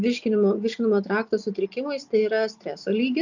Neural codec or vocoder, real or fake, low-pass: none; real; 7.2 kHz